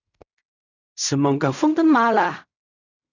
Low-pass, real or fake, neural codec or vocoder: 7.2 kHz; fake; codec, 16 kHz in and 24 kHz out, 0.4 kbps, LongCat-Audio-Codec, fine tuned four codebook decoder